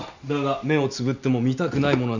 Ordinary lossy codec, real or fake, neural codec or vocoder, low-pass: none; real; none; 7.2 kHz